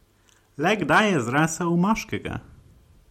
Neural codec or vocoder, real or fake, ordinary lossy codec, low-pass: none; real; MP3, 64 kbps; 19.8 kHz